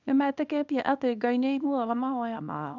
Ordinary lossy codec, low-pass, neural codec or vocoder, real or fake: none; 7.2 kHz; codec, 24 kHz, 0.9 kbps, WavTokenizer, small release; fake